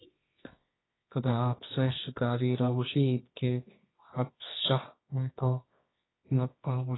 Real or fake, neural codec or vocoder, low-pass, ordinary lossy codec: fake; codec, 24 kHz, 0.9 kbps, WavTokenizer, medium music audio release; 7.2 kHz; AAC, 16 kbps